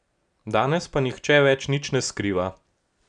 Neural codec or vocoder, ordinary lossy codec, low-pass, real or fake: none; none; 9.9 kHz; real